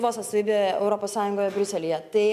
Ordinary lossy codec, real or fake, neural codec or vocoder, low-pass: MP3, 96 kbps; real; none; 14.4 kHz